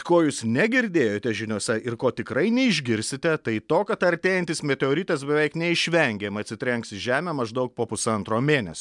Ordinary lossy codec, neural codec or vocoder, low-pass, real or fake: MP3, 96 kbps; none; 10.8 kHz; real